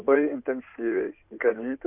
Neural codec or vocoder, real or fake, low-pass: codec, 16 kHz in and 24 kHz out, 2.2 kbps, FireRedTTS-2 codec; fake; 3.6 kHz